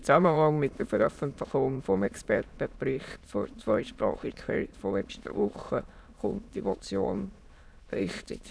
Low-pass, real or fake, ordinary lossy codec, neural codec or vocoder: none; fake; none; autoencoder, 22.05 kHz, a latent of 192 numbers a frame, VITS, trained on many speakers